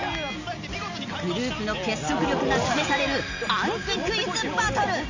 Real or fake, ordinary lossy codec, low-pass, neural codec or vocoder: real; AAC, 48 kbps; 7.2 kHz; none